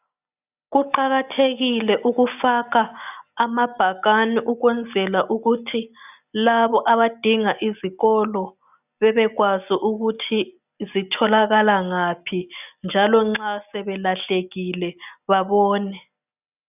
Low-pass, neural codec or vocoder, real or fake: 3.6 kHz; none; real